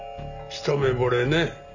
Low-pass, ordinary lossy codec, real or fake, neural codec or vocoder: 7.2 kHz; none; real; none